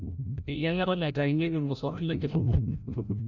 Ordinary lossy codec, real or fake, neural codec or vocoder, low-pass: none; fake; codec, 16 kHz, 0.5 kbps, FreqCodec, larger model; 7.2 kHz